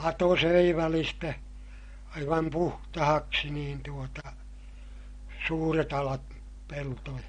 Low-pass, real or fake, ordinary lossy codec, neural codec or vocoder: 9.9 kHz; real; MP3, 48 kbps; none